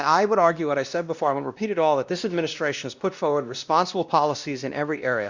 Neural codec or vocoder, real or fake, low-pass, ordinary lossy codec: codec, 16 kHz, 1 kbps, X-Codec, WavLM features, trained on Multilingual LibriSpeech; fake; 7.2 kHz; Opus, 64 kbps